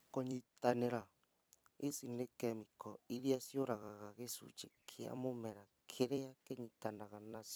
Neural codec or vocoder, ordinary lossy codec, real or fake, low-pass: vocoder, 44.1 kHz, 128 mel bands every 256 samples, BigVGAN v2; none; fake; none